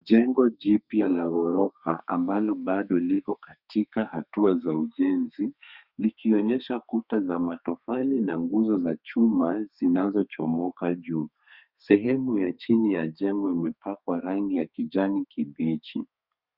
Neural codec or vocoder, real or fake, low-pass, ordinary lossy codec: codec, 32 kHz, 1.9 kbps, SNAC; fake; 5.4 kHz; Opus, 64 kbps